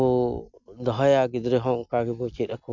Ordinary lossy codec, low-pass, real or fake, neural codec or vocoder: none; 7.2 kHz; real; none